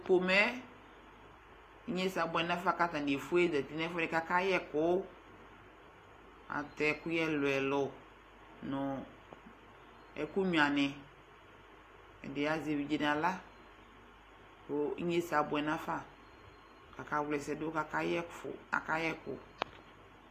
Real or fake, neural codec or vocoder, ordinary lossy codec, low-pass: real; none; AAC, 48 kbps; 14.4 kHz